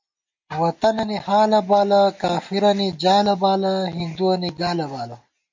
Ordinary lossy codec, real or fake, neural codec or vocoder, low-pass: MP3, 48 kbps; real; none; 7.2 kHz